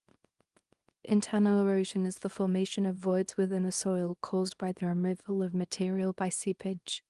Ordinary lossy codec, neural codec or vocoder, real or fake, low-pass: Opus, 24 kbps; codec, 24 kHz, 0.9 kbps, WavTokenizer, small release; fake; 10.8 kHz